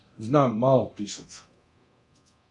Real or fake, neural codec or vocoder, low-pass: fake; codec, 24 kHz, 0.9 kbps, DualCodec; 10.8 kHz